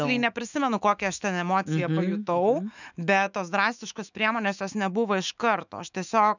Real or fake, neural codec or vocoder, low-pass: fake; autoencoder, 48 kHz, 128 numbers a frame, DAC-VAE, trained on Japanese speech; 7.2 kHz